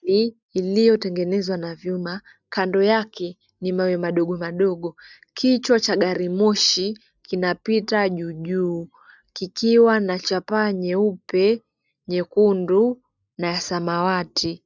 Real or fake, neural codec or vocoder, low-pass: real; none; 7.2 kHz